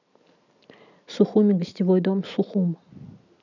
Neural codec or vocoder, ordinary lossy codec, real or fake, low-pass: none; none; real; 7.2 kHz